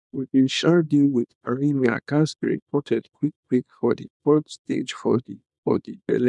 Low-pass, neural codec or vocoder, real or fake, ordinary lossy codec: 10.8 kHz; codec, 24 kHz, 0.9 kbps, WavTokenizer, small release; fake; none